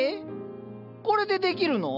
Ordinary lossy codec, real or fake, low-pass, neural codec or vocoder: none; real; 5.4 kHz; none